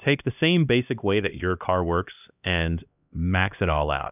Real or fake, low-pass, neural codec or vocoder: fake; 3.6 kHz; codec, 16 kHz, 1 kbps, X-Codec, HuBERT features, trained on LibriSpeech